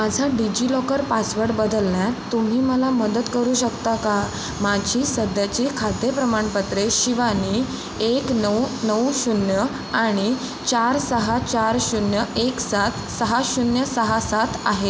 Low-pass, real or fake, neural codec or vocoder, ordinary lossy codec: none; real; none; none